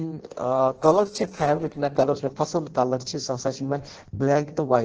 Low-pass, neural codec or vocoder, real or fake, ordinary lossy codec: 7.2 kHz; codec, 16 kHz in and 24 kHz out, 0.6 kbps, FireRedTTS-2 codec; fake; Opus, 16 kbps